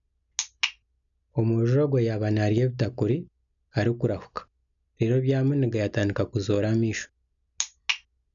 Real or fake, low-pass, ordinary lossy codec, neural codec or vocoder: real; 7.2 kHz; none; none